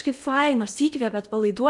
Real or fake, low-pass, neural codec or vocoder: fake; 10.8 kHz; codec, 16 kHz in and 24 kHz out, 0.8 kbps, FocalCodec, streaming, 65536 codes